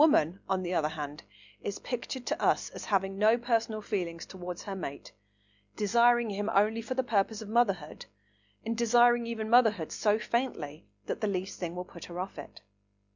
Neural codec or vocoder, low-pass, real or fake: none; 7.2 kHz; real